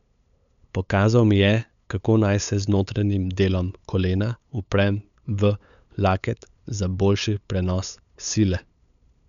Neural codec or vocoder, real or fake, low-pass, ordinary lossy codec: codec, 16 kHz, 8 kbps, FunCodec, trained on LibriTTS, 25 frames a second; fake; 7.2 kHz; none